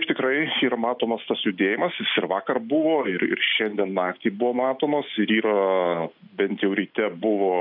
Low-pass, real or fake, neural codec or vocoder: 5.4 kHz; real; none